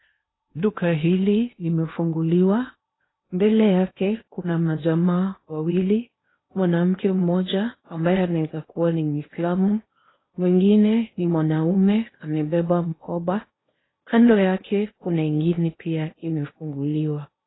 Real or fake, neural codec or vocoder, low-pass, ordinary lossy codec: fake; codec, 16 kHz in and 24 kHz out, 0.8 kbps, FocalCodec, streaming, 65536 codes; 7.2 kHz; AAC, 16 kbps